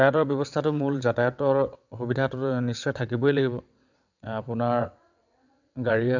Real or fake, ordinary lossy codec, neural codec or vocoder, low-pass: fake; none; vocoder, 22.05 kHz, 80 mel bands, WaveNeXt; 7.2 kHz